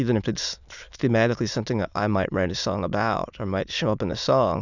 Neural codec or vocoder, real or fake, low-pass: autoencoder, 22.05 kHz, a latent of 192 numbers a frame, VITS, trained on many speakers; fake; 7.2 kHz